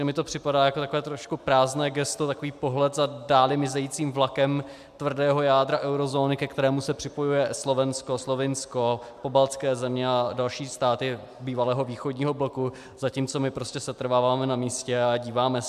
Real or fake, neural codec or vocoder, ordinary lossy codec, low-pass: real; none; MP3, 96 kbps; 14.4 kHz